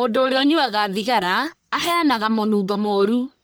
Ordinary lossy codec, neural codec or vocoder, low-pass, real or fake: none; codec, 44.1 kHz, 1.7 kbps, Pupu-Codec; none; fake